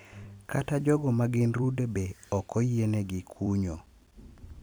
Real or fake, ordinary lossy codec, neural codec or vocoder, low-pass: fake; none; vocoder, 44.1 kHz, 128 mel bands every 256 samples, BigVGAN v2; none